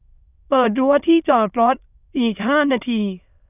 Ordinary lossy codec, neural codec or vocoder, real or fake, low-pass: none; autoencoder, 22.05 kHz, a latent of 192 numbers a frame, VITS, trained on many speakers; fake; 3.6 kHz